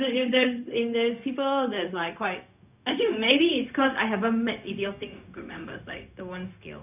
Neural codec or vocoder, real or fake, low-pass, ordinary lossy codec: codec, 16 kHz, 0.4 kbps, LongCat-Audio-Codec; fake; 3.6 kHz; none